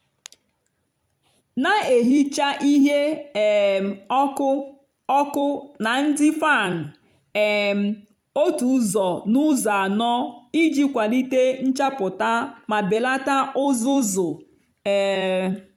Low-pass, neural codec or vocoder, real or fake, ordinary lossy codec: 19.8 kHz; vocoder, 44.1 kHz, 128 mel bands every 256 samples, BigVGAN v2; fake; none